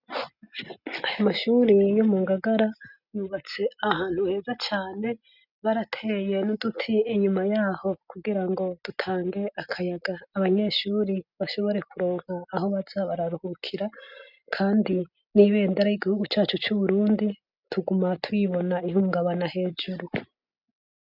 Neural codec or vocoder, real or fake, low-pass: none; real; 5.4 kHz